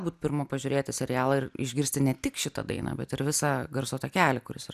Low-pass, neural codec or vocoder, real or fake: 14.4 kHz; none; real